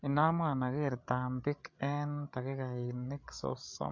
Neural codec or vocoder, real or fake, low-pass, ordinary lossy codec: codec, 16 kHz, 8 kbps, FreqCodec, larger model; fake; 7.2 kHz; MP3, 48 kbps